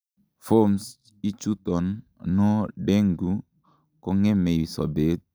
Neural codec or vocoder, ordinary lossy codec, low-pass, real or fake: none; none; none; real